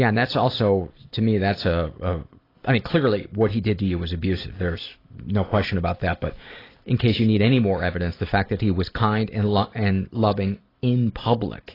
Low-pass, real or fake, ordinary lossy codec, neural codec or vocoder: 5.4 kHz; fake; AAC, 24 kbps; vocoder, 44.1 kHz, 128 mel bands every 256 samples, BigVGAN v2